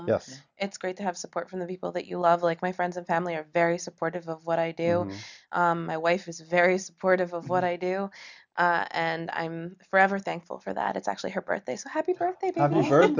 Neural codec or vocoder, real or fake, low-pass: none; real; 7.2 kHz